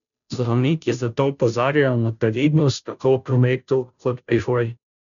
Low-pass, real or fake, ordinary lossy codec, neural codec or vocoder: 7.2 kHz; fake; none; codec, 16 kHz, 0.5 kbps, FunCodec, trained on Chinese and English, 25 frames a second